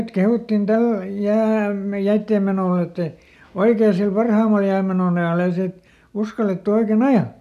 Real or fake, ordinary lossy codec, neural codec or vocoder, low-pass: real; none; none; 14.4 kHz